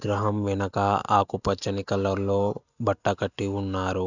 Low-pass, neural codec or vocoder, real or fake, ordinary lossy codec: 7.2 kHz; none; real; none